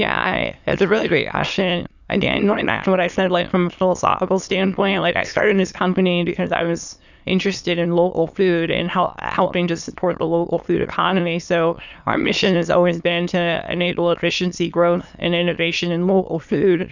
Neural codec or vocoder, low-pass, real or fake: autoencoder, 22.05 kHz, a latent of 192 numbers a frame, VITS, trained on many speakers; 7.2 kHz; fake